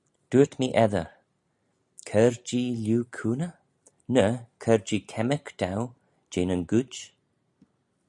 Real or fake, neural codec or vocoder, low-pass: real; none; 10.8 kHz